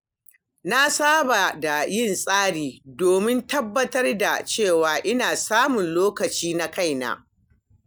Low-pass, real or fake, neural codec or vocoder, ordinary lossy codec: none; real; none; none